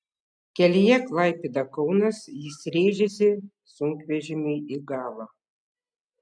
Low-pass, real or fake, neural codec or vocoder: 9.9 kHz; real; none